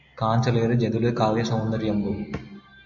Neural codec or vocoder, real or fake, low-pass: none; real; 7.2 kHz